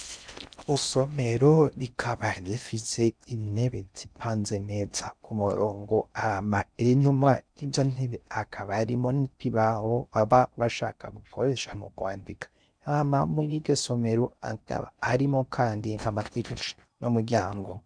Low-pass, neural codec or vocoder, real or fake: 9.9 kHz; codec, 16 kHz in and 24 kHz out, 0.6 kbps, FocalCodec, streaming, 4096 codes; fake